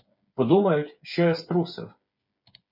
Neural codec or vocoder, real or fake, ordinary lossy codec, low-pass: codec, 16 kHz, 8 kbps, FreqCodec, smaller model; fake; MP3, 32 kbps; 5.4 kHz